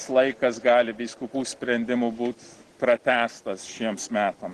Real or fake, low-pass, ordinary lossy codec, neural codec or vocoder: real; 10.8 kHz; Opus, 16 kbps; none